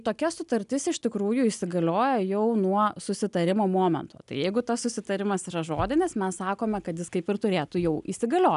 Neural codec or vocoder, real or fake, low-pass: none; real; 10.8 kHz